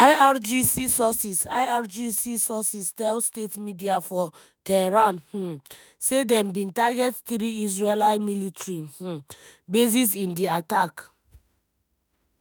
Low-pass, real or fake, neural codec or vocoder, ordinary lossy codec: none; fake; autoencoder, 48 kHz, 32 numbers a frame, DAC-VAE, trained on Japanese speech; none